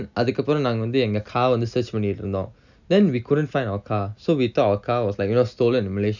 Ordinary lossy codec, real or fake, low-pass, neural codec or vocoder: none; real; 7.2 kHz; none